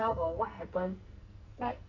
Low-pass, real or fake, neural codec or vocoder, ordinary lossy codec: 7.2 kHz; fake; codec, 44.1 kHz, 2.6 kbps, SNAC; none